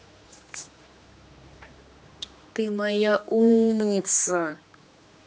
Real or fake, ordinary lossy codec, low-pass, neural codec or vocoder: fake; none; none; codec, 16 kHz, 2 kbps, X-Codec, HuBERT features, trained on general audio